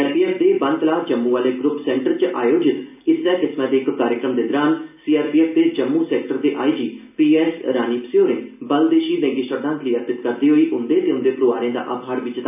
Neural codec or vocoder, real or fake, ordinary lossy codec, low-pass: none; real; none; 3.6 kHz